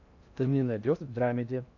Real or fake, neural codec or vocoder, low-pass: fake; codec, 16 kHz in and 24 kHz out, 0.6 kbps, FocalCodec, streaming, 2048 codes; 7.2 kHz